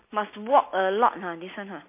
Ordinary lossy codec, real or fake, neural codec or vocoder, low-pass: MP3, 24 kbps; real; none; 3.6 kHz